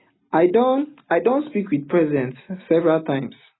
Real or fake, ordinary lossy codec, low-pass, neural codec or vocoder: real; AAC, 16 kbps; 7.2 kHz; none